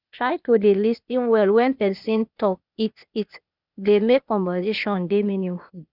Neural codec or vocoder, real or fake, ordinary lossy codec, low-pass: codec, 16 kHz, 0.8 kbps, ZipCodec; fake; none; 5.4 kHz